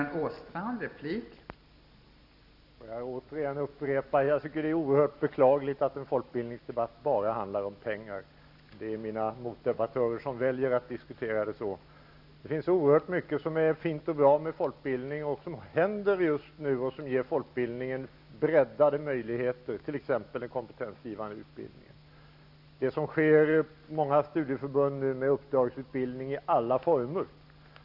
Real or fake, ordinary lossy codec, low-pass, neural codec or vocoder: real; none; 5.4 kHz; none